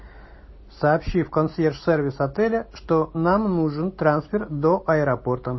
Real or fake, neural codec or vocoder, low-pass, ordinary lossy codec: real; none; 7.2 kHz; MP3, 24 kbps